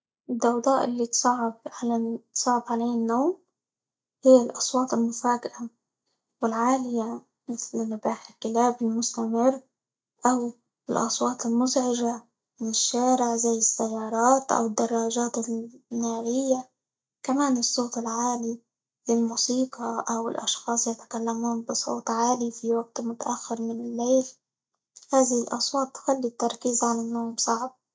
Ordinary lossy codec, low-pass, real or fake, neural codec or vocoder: none; none; real; none